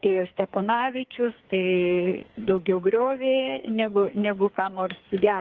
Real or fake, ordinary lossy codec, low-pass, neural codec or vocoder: fake; Opus, 16 kbps; 7.2 kHz; codec, 44.1 kHz, 2.6 kbps, SNAC